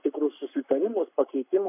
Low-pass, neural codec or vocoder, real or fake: 3.6 kHz; codec, 44.1 kHz, 7.8 kbps, Pupu-Codec; fake